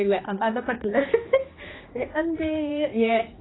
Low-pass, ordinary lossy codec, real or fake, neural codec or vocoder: 7.2 kHz; AAC, 16 kbps; fake; codec, 16 kHz, 2 kbps, X-Codec, HuBERT features, trained on general audio